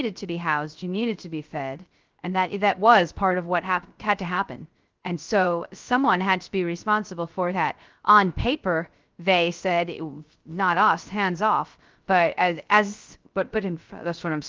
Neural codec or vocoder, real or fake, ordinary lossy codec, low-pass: codec, 16 kHz, 0.3 kbps, FocalCodec; fake; Opus, 32 kbps; 7.2 kHz